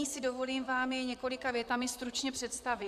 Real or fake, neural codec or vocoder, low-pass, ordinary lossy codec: fake; vocoder, 44.1 kHz, 128 mel bands, Pupu-Vocoder; 14.4 kHz; AAC, 96 kbps